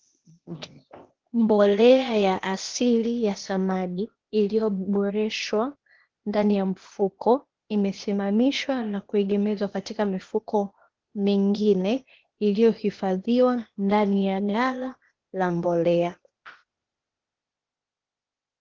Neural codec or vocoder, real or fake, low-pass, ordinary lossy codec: codec, 16 kHz, 0.8 kbps, ZipCodec; fake; 7.2 kHz; Opus, 16 kbps